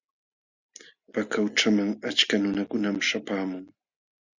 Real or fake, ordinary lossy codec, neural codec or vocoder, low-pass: real; Opus, 64 kbps; none; 7.2 kHz